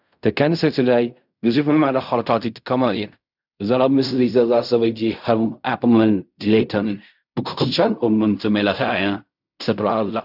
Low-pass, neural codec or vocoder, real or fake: 5.4 kHz; codec, 16 kHz in and 24 kHz out, 0.4 kbps, LongCat-Audio-Codec, fine tuned four codebook decoder; fake